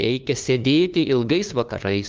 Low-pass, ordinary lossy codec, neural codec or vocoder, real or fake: 7.2 kHz; Opus, 32 kbps; codec, 16 kHz, 2 kbps, FunCodec, trained on LibriTTS, 25 frames a second; fake